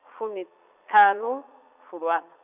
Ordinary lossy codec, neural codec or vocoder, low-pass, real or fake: none; vocoder, 22.05 kHz, 80 mel bands, Vocos; 3.6 kHz; fake